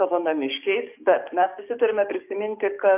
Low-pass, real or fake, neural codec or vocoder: 3.6 kHz; fake; codec, 44.1 kHz, 7.8 kbps, DAC